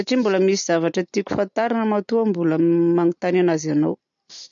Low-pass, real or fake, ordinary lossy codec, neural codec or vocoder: 7.2 kHz; real; none; none